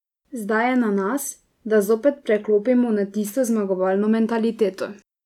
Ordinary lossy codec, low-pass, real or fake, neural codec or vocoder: none; 19.8 kHz; real; none